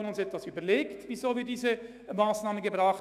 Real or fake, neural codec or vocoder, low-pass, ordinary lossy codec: fake; autoencoder, 48 kHz, 128 numbers a frame, DAC-VAE, trained on Japanese speech; 14.4 kHz; none